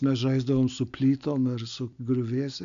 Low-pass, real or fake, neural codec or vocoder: 7.2 kHz; real; none